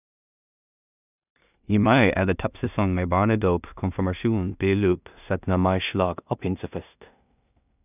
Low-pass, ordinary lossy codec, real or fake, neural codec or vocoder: 3.6 kHz; none; fake; codec, 16 kHz in and 24 kHz out, 0.4 kbps, LongCat-Audio-Codec, two codebook decoder